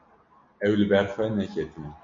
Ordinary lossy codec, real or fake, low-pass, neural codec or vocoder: MP3, 48 kbps; real; 7.2 kHz; none